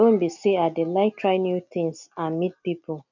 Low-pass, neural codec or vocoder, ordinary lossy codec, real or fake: 7.2 kHz; none; none; real